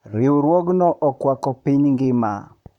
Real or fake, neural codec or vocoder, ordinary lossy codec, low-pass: fake; vocoder, 44.1 kHz, 128 mel bands, Pupu-Vocoder; none; 19.8 kHz